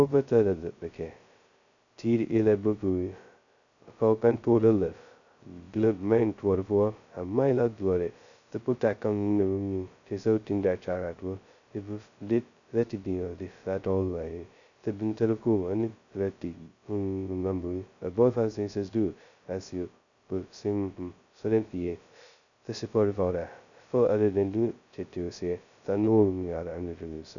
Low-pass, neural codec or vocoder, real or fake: 7.2 kHz; codec, 16 kHz, 0.2 kbps, FocalCodec; fake